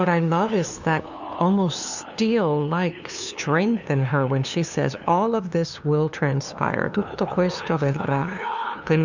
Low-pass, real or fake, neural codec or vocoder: 7.2 kHz; fake; codec, 16 kHz, 2 kbps, FunCodec, trained on LibriTTS, 25 frames a second